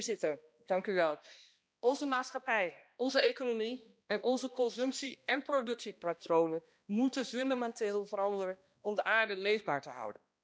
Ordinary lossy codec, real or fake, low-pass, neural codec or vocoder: none; fake; none; codec, 16 kHz, 1 kbps, X-Codec, HuBERT features, trained on balanced general audio